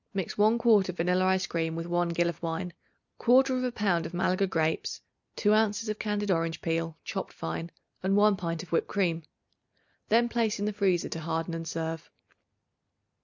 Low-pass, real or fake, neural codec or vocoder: 7.2 kHz; real; none